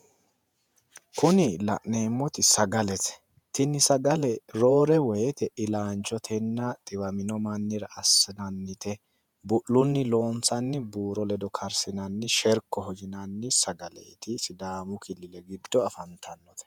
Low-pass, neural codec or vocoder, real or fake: 19.8 kHz; vocoder, 48 kHz, 128 mel bands, Vocos; fake